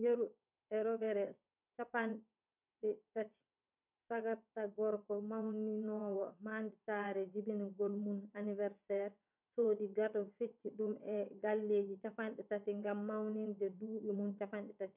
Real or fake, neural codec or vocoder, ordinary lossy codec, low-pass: fake; vocoder, 22.05 kHz, 80 mel bands, WaveNeXt; none; 3.6 kHz